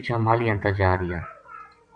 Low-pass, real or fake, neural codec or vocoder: 9.9 kHz; fake; vocoder, 22.05 kHz, 80 mel bands, WaveNeXt